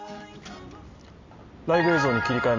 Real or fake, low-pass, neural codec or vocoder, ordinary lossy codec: real; 7.2 kHz; none; MP3, 64 kbps